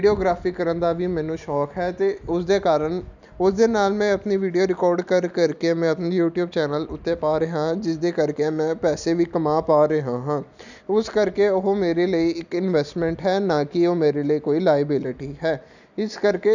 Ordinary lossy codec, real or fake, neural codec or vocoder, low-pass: none; real; none; 7.2 kHz